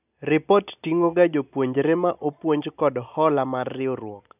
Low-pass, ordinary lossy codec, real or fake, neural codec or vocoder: 3.6 kHz; none; real; none